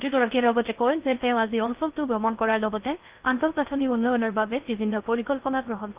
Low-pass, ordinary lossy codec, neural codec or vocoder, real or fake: 3.6 kHz; Opus, 24 kbps; codec, 16 kHz in and 24 kHz out, 0.6 kbps, FocalCodec, streaming, 4096 codes; fake